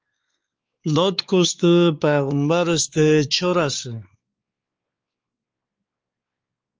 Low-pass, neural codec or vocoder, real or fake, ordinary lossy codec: 7.2 kHz; codec, 16 kHz, 4 kbps, X-Codec, WavLM features, trained on Multilingual LibriSpeech; fake; Opus, 24 kbps